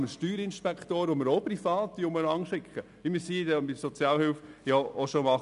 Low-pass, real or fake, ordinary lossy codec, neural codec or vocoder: 10.8 kHz; real; none; none